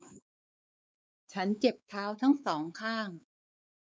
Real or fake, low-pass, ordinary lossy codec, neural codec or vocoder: fake; none; none; codec, 16 kHz, 4 kbps, X-Codec, WavLM features, trained on Multilingual LibriSpeech